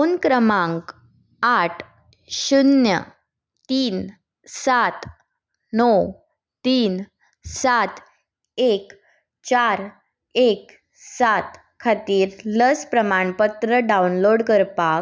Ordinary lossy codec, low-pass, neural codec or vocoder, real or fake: none; none; none; real